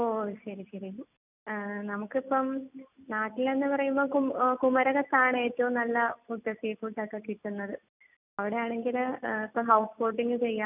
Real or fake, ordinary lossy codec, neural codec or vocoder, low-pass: real; none; none; 3.6 kHz